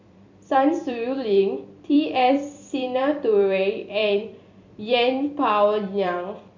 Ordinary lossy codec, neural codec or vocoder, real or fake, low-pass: MP3, 64 kbps; vocoder, 44.1 kHz, 128 mel bands every 256 samples, BigVGAN v2; fake; 7.2 kHz